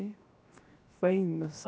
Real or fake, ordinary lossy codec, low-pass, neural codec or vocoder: fake; none; none; codec, 16 kHz, 0.7 kbps, FocalCodec